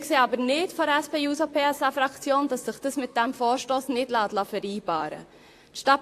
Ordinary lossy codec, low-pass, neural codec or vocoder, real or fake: AAC, 64 kbps; 14.4 kHz; vocoder, 44.1 kHz, 128 mel bands, Pupu-Vocoder; fake